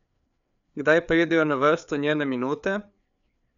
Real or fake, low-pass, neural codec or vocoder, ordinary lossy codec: fake; 7.2 kHz; codec, 16 kHz, 4 kbps, FreqCodec, larger model; none